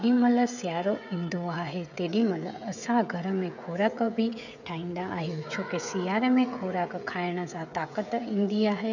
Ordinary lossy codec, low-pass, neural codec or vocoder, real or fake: none; 7.2 kHz; codec, 16 kHz, 16 kbps, FreqCodec, smaller model; fake